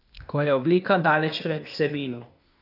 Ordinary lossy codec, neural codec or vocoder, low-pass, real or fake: none; codec, 16 kHz, 0.8 kbps, ZipCodec; 5.4 kHz; fake